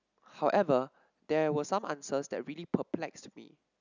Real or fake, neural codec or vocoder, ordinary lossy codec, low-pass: real; none; none; 7.2 kHz